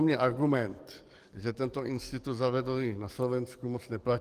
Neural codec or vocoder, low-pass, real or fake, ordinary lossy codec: codec, 44.1 kHz, 7.8 kbps, DAC; 14.4 kHz; fake; Opus, 24 kbps